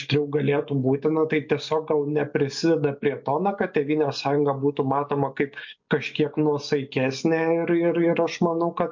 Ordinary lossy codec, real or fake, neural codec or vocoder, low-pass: MP3, 48 kbps; real; none; 7.2 kHz